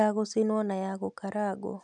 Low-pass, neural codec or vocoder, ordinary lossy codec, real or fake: 10.8 kHz; none; none; real